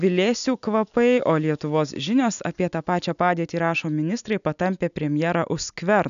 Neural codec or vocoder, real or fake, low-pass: none; real; 7.2 kHz